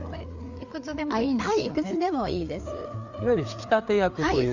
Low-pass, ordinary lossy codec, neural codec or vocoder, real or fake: 7.2 kHz; none; codec, 16 kHz, 4 kbps, FreqCodec, larger model; fake